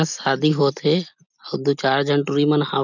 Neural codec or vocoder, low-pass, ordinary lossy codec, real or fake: none; 7.2 kHz; none; real